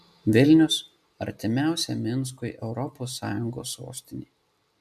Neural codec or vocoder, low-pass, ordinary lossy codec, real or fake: none; 14.4 kHz; MP3, 96 kbps; real